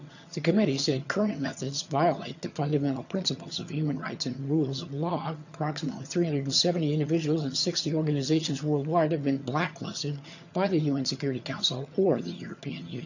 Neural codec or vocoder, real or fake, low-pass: vocoder, 22.05 kHz, 80 mel bands, HiFi-GAN; fake; 7.2 kHz